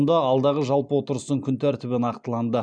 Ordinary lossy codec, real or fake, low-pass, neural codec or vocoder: none; real; 9.9 kHz; none